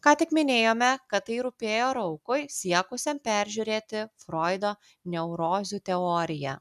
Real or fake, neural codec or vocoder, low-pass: real; none; 14.4 kHz